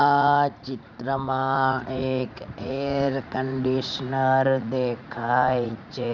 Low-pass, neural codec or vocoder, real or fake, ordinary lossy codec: 7.2 kHz; vocoder, 44.1 kHz, 80 mel bands, Vocos; fake; none